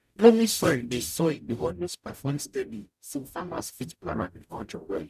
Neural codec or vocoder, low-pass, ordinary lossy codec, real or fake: codec, 44.1 kHz, 0.9 kbps, DAC; 14.4 kHz; none; fake